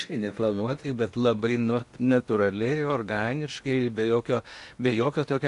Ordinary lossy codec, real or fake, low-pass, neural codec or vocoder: AAC, 64 kbps; fake; 10.8 kHz; codec, 16 kHz in and 24 kHz out, 0.8 kbps, FocalCodec, streaming, 65536 codes